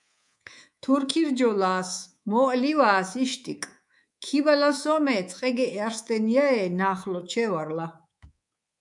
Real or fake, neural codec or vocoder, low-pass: fake; codec, 24 kHz, 3.1 kbps, DualCodec; 10.8 kHz